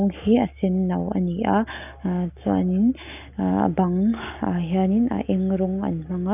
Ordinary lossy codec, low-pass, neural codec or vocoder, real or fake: none; 3.6 kHz; none; real